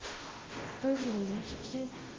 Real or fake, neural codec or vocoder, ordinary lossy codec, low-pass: fake; codec, 16 kHz, 0.5 kbps, FunCodec, trained on Chinese and English, 25 frames a second; Opus, 16 kbps; 7.2 kHz